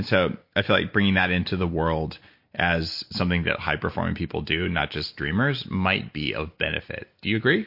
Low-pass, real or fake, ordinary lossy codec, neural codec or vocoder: 5.4 kHz; real; MP3, 32 kbps; none